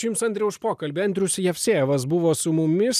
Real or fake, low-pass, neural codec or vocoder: real; 14.4 kHz; none